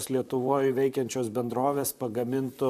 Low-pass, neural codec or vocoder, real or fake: 14.4 kHz; vocoder, 44.1 kHz, 128 mel bands, Pupu-Vocoder; fake